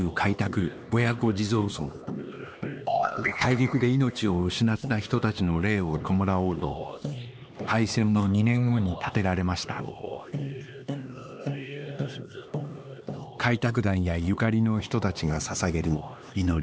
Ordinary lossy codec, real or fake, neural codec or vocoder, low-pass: none; fake; codec, 16 kHz, 2 kbps, X-Codec, HuBERT features, trained on LibriSpeech; none